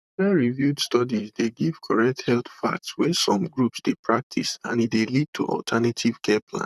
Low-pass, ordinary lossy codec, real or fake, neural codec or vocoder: 14.4 kHz; none; fake; vocoder, 44.1 kHz, 128 mel bands, Pupu-Vocoder